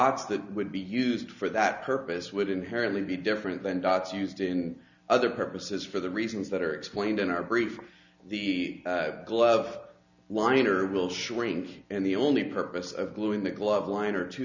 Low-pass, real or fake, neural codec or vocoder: 7.2 kHz; real; none